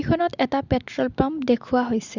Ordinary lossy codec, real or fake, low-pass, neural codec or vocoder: Opus, 64 kbps; real; 7.2 kHz; none